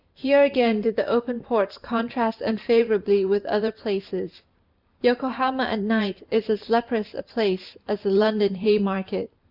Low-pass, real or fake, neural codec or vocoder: 5.4 kHz; fake; vocoder, 44.1 kHz, 128 mel bands every 512 samples, BigVGAN v2